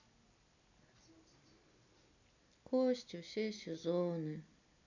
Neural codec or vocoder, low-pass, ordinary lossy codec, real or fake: none; 7.2 kHz; none; real